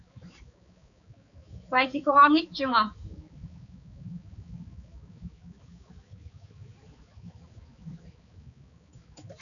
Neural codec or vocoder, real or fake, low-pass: codec, 16 kHz, 4 kbps, X-Codec, HuBERT features, trained on balanced general audio; fake; 7.2 kHz